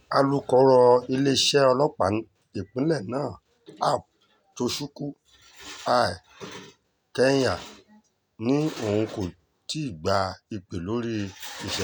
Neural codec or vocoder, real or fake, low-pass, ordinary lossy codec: none; real; none; none